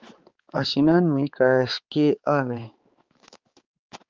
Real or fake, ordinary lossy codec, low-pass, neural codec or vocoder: fake; Opus, 32 kbps; 7.2 kHz; codec, 16 kHz, 4 kbps, X-Codec, HuBERT features, trained on balanced general audio